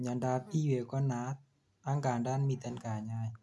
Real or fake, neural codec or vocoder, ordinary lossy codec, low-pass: real; none; none; none